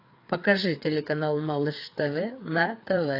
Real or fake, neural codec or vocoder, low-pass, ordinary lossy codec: fake; codec, 16 kHz, 4 kbps, FreqCodec, larger model; 5.4 kHz; AAC, 32 kbps